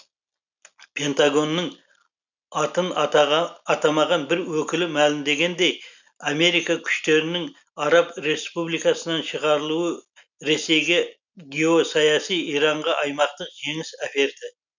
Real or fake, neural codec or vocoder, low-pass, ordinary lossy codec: real; none; 7.2 kHz; none